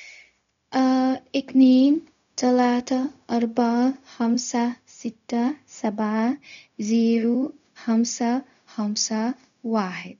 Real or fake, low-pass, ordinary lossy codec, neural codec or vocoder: fake; 7.2 kHz; none; codec, 16 kHz, 0.4 kbps, LongCat-Audio-Codec